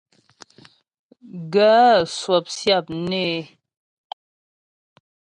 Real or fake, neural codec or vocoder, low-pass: real; none; 9.9 kHz